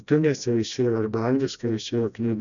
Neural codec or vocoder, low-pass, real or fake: codec, 16 kHz, 1 kbps, FreqCodec, smaller model; 7.2 kHz; fake